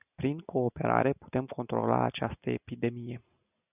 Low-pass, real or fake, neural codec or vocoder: 3.6 kHz; real; none